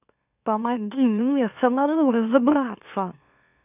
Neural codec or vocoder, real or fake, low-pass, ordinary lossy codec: autoencoder, 44.1 kHz, a latent of 192 numbers a frame, MeloTTS; fake; 3.6 kHz; none